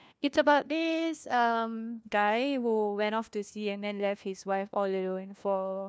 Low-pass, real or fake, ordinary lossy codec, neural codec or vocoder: none; fake; none; codec, 16 kHz, 1 kbps, FunCodec, trained on LibriTTS, 50 frames a second